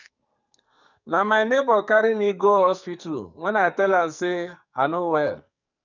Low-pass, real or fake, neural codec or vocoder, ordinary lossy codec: 7.2 kHz; fake; codec, 44.1 kHz, 2.6 kbps, SNAC; none